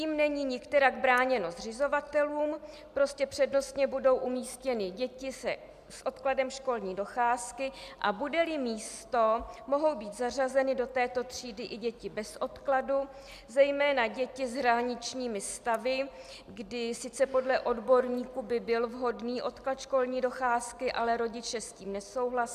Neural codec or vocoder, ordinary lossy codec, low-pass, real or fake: none; MP3, 96 kbps; 14.4 kHz; real